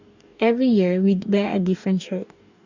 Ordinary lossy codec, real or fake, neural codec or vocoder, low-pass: none; fake; codec, 44.1 kHz, 2.6 kbps, DAC; 7.2 kHz